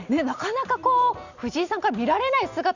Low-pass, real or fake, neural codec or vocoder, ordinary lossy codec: 7.2 kHz; real; none; Opus, 64 kbps